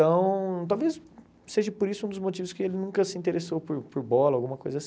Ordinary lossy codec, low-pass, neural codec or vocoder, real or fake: none; none; none; real